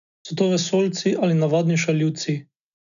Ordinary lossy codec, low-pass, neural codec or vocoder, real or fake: none; 7.2 kHz; none; real